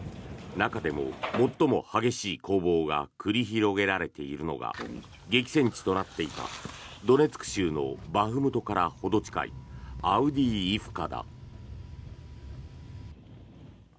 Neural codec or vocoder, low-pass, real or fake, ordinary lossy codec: none; none; real; none